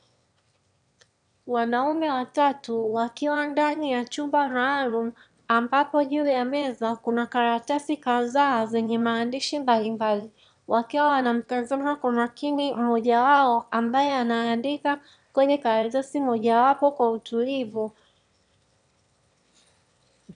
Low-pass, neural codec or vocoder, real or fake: 9.9 kHz; autoencoder, 22.05 kHz, a latent of 192 numbers a frame, VITS, trained on one speaker; fake